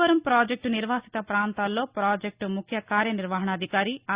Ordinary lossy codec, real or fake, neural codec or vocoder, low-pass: Opus, 64 kbps; real; none; 3.6 kHz